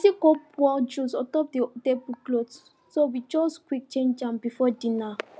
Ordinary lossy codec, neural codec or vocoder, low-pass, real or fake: none; none; none; real